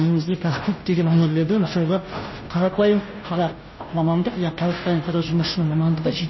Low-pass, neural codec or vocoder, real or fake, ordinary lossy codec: 7.2 kHz; codec, 16 kHz, 0.5 kbps, FunCodec, trained on Chinese and English, 25 frames a second; fake; MP3, 24 kbps